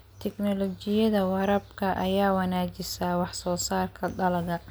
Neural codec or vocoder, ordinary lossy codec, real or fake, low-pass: none; none; real; none